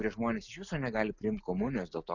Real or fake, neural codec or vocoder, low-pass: real; none; 7.2 kHz